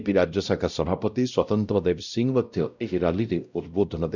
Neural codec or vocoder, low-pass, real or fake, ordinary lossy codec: codec, 16 kHz, 0.5 kbps, X-Codec, WavLM features, trained on Multilingual LibriSpeech; 7.2 kHz; fake; none